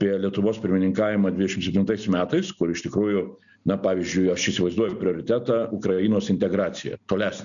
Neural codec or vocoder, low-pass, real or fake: none; 7.2 kHz; real